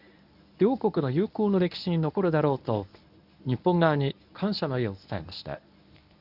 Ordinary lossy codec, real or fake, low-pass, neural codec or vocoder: none; fake; 5.4 kHz; codec, 24 kHz, 0.9 kbps, WavTokenizer, medium speech release version 1